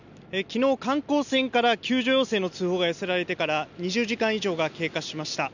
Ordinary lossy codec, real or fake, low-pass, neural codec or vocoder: none; real; 7.2 kHz; none